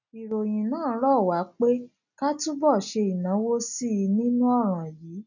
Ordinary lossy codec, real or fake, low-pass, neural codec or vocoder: none; real; 7.2 kHz; none